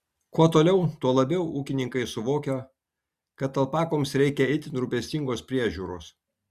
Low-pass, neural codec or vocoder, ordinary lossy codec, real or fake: 14.4 kHz; none; Opus, 64 kbps; real